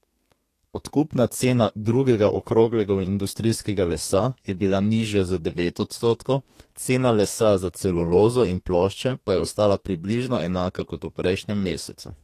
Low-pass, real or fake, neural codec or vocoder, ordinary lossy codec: 14.4 kHz; fake; codec, 32 kHz, 1.9 kbps, SNAC; AAC, 48 kbps